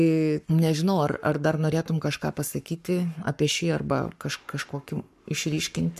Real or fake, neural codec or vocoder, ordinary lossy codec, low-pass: fake; codec, 44.1 kHz, 7.8 kbps, Pupu-Codec; MP3, 96 kbps; 14.4 kHz